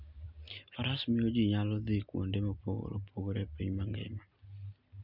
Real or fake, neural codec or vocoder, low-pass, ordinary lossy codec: real; none; 5.4 kHz; none